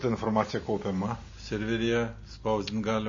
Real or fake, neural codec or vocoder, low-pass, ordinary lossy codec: real; none; 7.2 kHz; MP3, 32 kbps